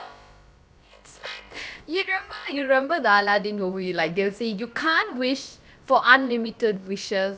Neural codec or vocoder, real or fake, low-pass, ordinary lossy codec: codec, 16 kHz, about 1 kbps, DyCAST, with the encoder's durations; fake; none; none